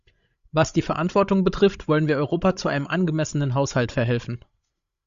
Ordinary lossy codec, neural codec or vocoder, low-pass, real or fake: Opus, 64 kbps; codec, 16 kHz, 16 kbps, FreqCodec, larger model; 7.2 kHz; fake